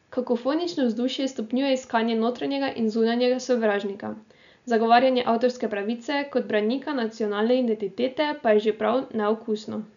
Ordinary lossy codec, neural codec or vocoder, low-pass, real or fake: none; none; 7.2 kHz; real